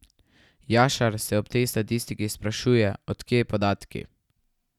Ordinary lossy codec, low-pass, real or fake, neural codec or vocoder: none; 19.8 kHz; real; none